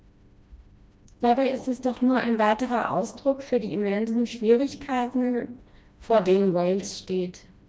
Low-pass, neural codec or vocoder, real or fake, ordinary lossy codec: none; codec, 16 kHz, 1 kbps, FreqCodec, smaller model; fake; none